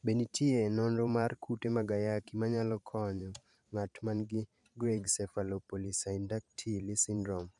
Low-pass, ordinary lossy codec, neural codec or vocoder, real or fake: 10.8 kHz; none; vocoder, 44.1 kHz, 128 mel bands every 512 samples, BigVGAN v2; fake